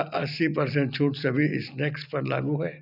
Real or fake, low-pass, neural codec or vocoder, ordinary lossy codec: real; 5.4 kHz; none; none